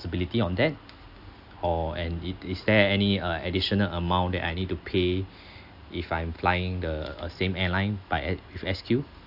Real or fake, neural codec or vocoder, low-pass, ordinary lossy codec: real; none; 5.4 kHz; AAC, 48 kbps